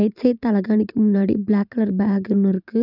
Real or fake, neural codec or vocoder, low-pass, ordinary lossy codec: fake; vocoder, 44.1 kHz, 128 mel bands every 512 samples, BigVGAN v2; 5.4 kHz; none